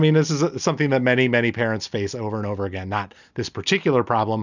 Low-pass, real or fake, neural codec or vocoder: 7.2 kHz; real; none